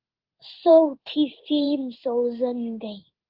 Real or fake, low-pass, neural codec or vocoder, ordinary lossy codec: fake; 5.4 kHz; codec, 16 kHz in and 24 kHz out, 1 kbps, XY-Tokenizer; Opus, 16 kbps